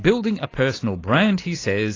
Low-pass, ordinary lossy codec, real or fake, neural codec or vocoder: 7.2 kHz; AAC, 32 kbps; real; none